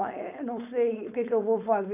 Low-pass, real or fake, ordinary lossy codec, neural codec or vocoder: 3.6 kHz; fake; none; codec, 16 kHz, 4.8 kbps, FACodec